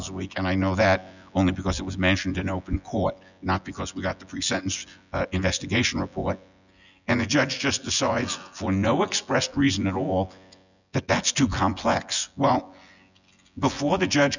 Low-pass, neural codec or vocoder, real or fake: 7.2 kHz; vocoder, 24 kHz, 100 mel bands, Vocos; fake